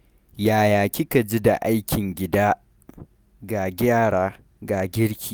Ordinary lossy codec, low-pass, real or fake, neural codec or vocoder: none; none; real; none